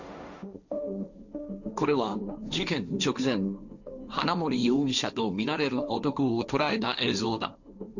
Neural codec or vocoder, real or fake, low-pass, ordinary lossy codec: codec, 16 kHz, 1.1 kbps, Voila-Tokenizer; fake; 7.2 kHz; none